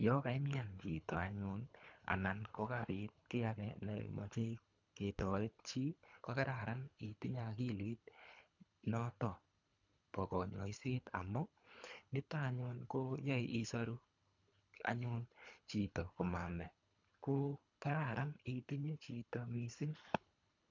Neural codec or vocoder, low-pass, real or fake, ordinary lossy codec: codec, 24 kHz, 3 kbps, HILCodec; 7.2 kHz; fake; none